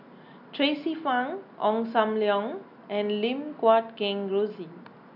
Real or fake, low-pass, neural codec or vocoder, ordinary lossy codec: real; 5.4 kHz; none; none